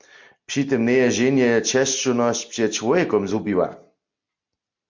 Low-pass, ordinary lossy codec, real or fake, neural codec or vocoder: 7.2 kHz; MP3, 48 kbps; real; none